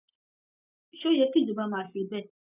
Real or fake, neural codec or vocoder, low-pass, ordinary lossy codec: real; none; 3.6 kHz; none